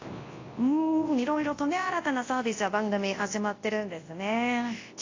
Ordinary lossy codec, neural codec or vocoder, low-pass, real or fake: AAC, 32 kbps; codec, 24 kHz, 0.9 kbps, WavTokenizer, large speech release; 7.2 kHz; fake